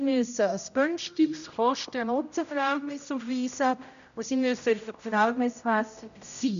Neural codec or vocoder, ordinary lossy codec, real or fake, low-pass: codec, 16 kHz, 0.5 kbps, X-Codec, HuBERT features, trained on general audio; none; fake; 7.2 kHz